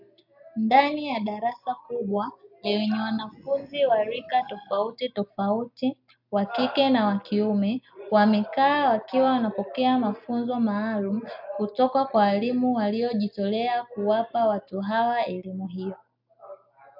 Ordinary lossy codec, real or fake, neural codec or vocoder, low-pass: AAC, 48 kbps; real; none; 5.4 kHz